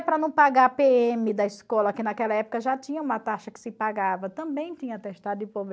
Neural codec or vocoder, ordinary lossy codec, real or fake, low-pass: none; none; real; none